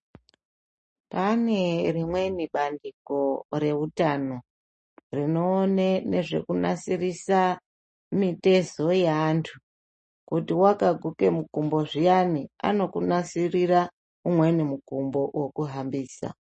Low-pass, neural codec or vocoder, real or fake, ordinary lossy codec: 10.8 kHz; none; real; MP3, 32 kbps